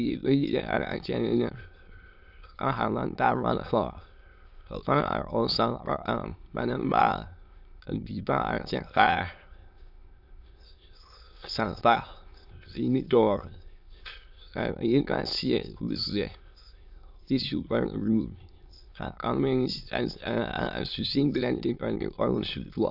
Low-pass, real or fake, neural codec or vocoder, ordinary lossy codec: 5.4 kHz; fake; autoencoder, 22.05 kHz, a latent of 192 numbers a frame, VITS, trained on many speakers; AAC, 48 kbps